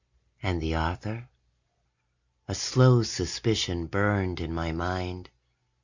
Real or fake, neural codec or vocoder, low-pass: real; none; 7.2 kHz